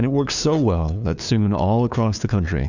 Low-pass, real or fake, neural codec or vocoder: 7.2 kHz; fake; codec, 16 kHz, 2 kbps, FunCodec, trained on LibriTTS, 25 frames a second